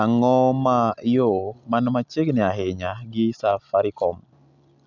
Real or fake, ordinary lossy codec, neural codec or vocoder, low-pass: real; none; none; 7.2 kHz